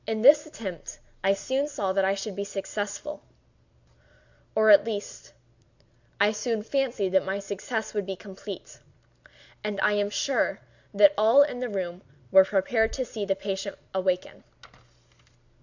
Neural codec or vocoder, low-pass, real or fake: none; 7.2 kHz; real